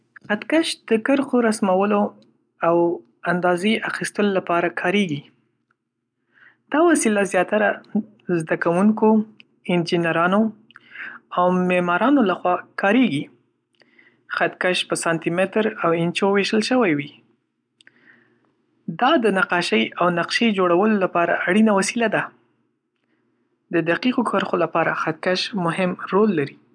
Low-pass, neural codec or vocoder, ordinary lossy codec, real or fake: 9.9 kHz; none; none; real